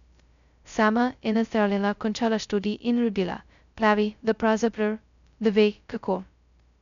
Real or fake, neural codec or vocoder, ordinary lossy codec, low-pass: fake; codec, 16 kHz, 0.2 kbps, FocalCodec; none; 7.2 kHz